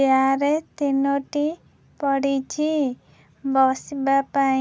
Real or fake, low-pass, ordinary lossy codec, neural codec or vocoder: real; none; none; none